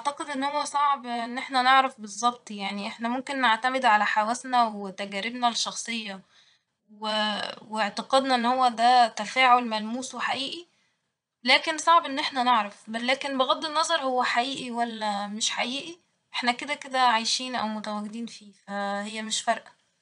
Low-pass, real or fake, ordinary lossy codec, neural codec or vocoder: 9.9 kHz; fake; none; vocoder, 22.05 kHz, 80 mel bands, Vocos